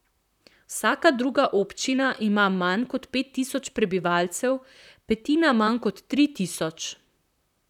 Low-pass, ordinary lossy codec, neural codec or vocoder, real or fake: 19.8 kHz; none; vocoder, 44.1 kHz, 128 mel bands, Pupu-Vocoder; fake